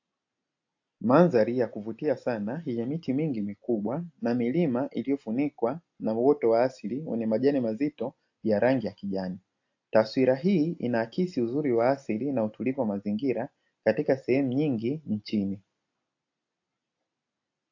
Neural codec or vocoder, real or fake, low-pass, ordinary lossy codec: none; real; 7.2 kHz; AAC, 48 kbps